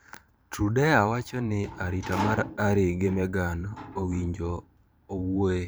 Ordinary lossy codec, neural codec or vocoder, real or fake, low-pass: none; vocoder, 44.1 kHz, 128 mel bands every 512 samples, BigVGAN v2; fake; none